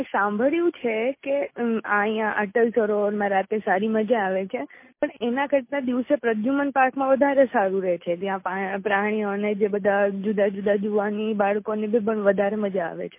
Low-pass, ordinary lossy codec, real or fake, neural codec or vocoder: 3.6 kHz; MP3, 24 kbps; real; none